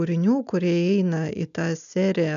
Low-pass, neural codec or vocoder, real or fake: 7.2 kHz; none; real